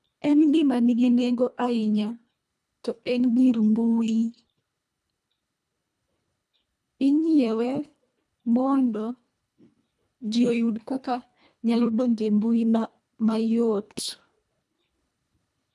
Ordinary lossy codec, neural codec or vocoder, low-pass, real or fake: none; codec, 24 kHz, 1.5 kbps, HILCodec; none; fake